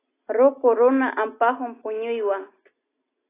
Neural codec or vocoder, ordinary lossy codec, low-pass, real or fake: none; AAC, 16 kbps; 3.6 kHz; real